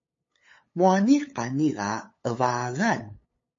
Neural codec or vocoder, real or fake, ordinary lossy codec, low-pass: codec, 16 kHz, 8 kbps, FunCodec, trained on LibriTTS, 25 frames a second; fake; MP3, 32 kbps; 7.2 kHz